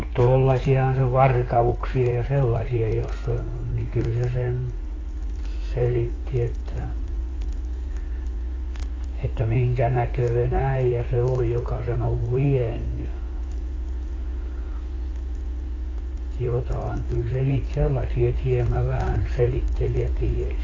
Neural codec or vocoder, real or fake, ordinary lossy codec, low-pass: codec, 16 kHz in and 24 kHz out, 2.2 kbps, FireRedTTS-2 codec; fake; AAC, 32 kbps; 7.2 kHz